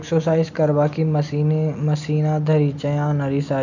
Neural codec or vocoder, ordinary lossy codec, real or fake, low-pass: none; none; real; 7.2 kHz